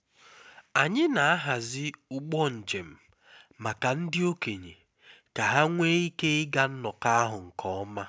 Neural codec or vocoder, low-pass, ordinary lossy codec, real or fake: none; none; none; real